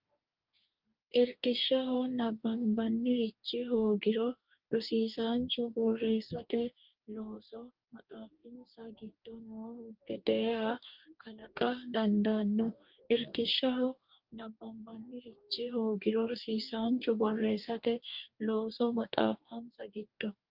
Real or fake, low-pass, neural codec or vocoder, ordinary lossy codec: fake; 5.4 kHz; codec, 44.1 kHz, 2.6 kbps, DAC; Opus, 32 kbps